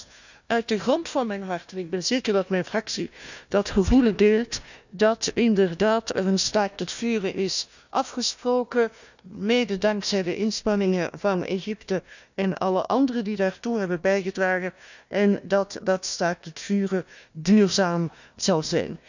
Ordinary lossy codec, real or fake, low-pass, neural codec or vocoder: none; fake; 7.2 kHz; codec, 16 kHz, 1 kbps, FunCodec, trained on Chinese and English, 50 frames a second